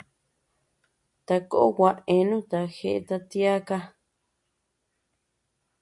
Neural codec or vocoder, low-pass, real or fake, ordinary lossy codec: none; 10.8 kHz; real; AAC, 64 kbps